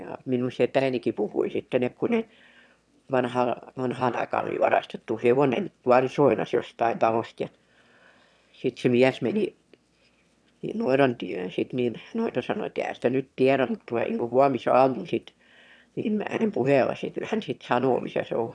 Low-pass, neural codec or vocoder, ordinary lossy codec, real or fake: none; autoencoder, 22.05 kHz, a latent of 192 numbers a frame, VITS, trained on one speaker; none; fake